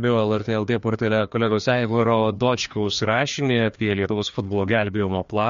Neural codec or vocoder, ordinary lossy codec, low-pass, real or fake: codec, 16 kHz, 2 kbps, FreqCodec, larger model; MP3, 48 kbps; 7.2 kHz; fake